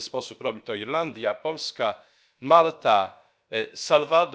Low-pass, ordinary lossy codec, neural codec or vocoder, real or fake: none; none; codec, 16 kHz, about 1 kbps, DyCAST, with the encoder's durations; fake